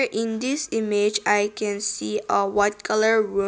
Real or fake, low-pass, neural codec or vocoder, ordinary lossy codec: real; none; none; none